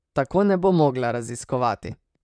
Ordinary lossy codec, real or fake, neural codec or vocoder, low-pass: none; fake; vocoder, 44.1 kHz, 128 mel bands, Pupu-Vocoder; 9.9 kHz